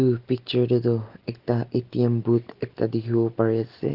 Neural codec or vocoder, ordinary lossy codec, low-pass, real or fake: none; Opus, 16 kbps; 5.4 kHz; real